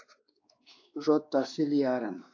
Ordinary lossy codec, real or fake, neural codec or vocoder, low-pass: AAC, 48 kbps; fake; codec, 16 kHz, 2 kbps, X-Codec, WavLM features, trained on Multilingual LibriSpeech; 7.2 kHz